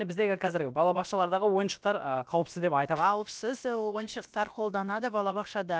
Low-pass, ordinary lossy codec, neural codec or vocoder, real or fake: none; none; codec, 16 kHz, about 1 kbps, DyCAST, with the encoder's durations; fake